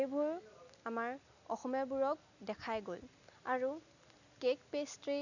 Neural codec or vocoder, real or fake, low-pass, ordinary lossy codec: none; real; 7.2 kHz; none